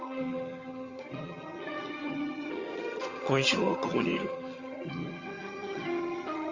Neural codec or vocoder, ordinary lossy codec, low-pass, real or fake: vocoder, 22.05 kHz, 80 mel bands, HiFi-GAN; Opus, 32 kbps; 7.2 kHz; fake